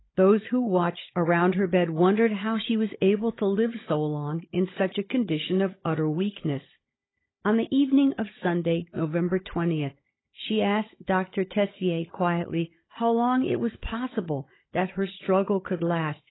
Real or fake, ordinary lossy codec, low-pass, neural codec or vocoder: fake; AAC, 16 kbps; 7.2 kHz; codec, 16 kHz, 16 kbps, FunCodec, trained on Chinese and English, 50 frames a second